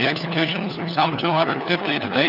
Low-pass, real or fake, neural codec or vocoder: 5.4 kHz; fake; codec, 16 kHz, 4 kbps, FunCodec, trained on LibriTTS, 50 frames a second